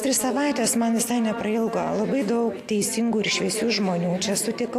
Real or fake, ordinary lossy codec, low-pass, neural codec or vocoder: real; AAC, 64 kbps; 14.4 kHz; none